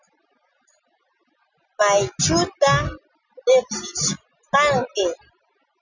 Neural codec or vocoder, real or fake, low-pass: none; real; 7.2 kHz